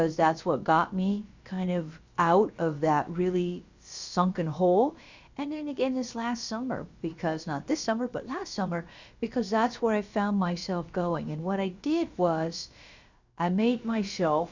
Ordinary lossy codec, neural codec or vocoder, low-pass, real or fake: Opus, 64 kbps; codec, 16 kHz, about 1 kbps, DyCAST, with the encoder's durations; 7.2 kHz; fake